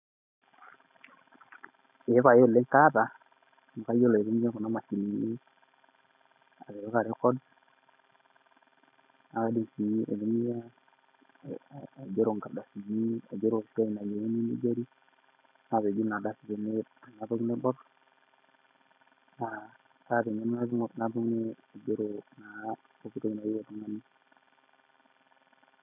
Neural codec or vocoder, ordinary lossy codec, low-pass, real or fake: none; none; 3.6 kHz; real